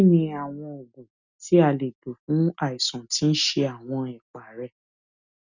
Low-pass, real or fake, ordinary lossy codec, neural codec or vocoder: 7.2 kHz; real; none; none